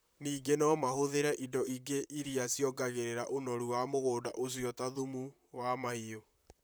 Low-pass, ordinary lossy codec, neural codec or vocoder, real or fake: none; none; vocoder, 44.1 kHz, 128 mel bands, Pupu-Vocoder; fake